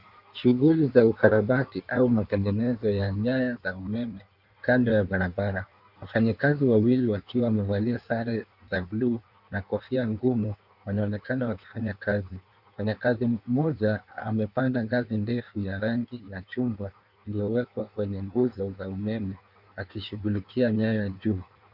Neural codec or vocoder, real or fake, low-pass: codec, 16 kHz in and 24 kHz out, 1.1 kbps, FireRedTTS-2 codec; fake; 5.4 kHz